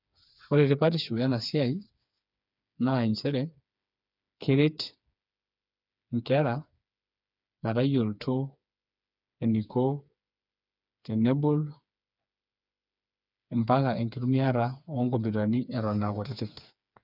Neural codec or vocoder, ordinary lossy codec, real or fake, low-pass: codec, 16 kHz, 4 kbps, FreqCodec, smaller model; none; fake; 5.4 kHz